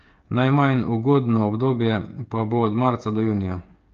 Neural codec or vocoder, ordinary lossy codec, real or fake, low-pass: codec, 16 kHz, 8 kbps, FreqCodec, smaller model; Opus, 24 kbps; fake; 7.2 kHz